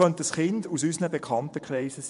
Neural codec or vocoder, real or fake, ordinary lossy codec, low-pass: vocoder, 24 kHz, 100 mel bands, Vocos; fake; none; 10.8 kHz